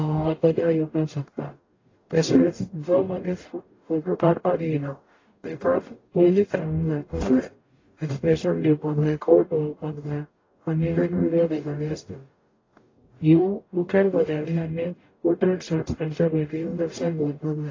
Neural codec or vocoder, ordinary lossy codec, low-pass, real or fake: codec, 44.1 kHz, 0.9 kbps, DAC; AAC, 32 kbps; 7.2 kHz; fake